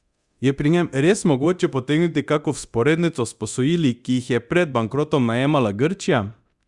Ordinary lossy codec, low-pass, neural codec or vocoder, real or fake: Opus, 64 kbps; 10.8 kHz; codec, 24 kHz, 0.9 kbps, DualCodec; fake